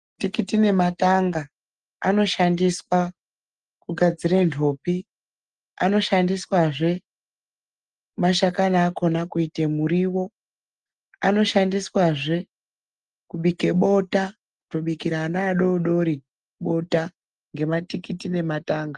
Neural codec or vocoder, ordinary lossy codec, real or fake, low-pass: none; Opus, 24 kbps; real; 10.8 kHz